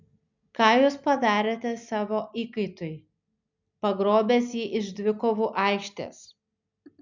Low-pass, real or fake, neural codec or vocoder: 7.2 kHz; real; none